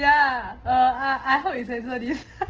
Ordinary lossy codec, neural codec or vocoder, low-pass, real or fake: Opus, 16 kbps; none; 7.2 kHz; real